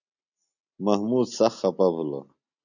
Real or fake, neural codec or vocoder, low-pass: real; none; 7.2 kHz